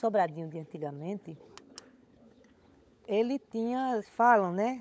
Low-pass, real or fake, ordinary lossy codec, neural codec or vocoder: none; fake; none; codec, 16 kHz, 16 kbps, FunCodec, trained on LibriTTS, 50 frames a second